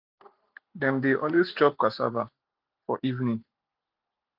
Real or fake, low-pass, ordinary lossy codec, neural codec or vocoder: real; 5.4 kHz; none; none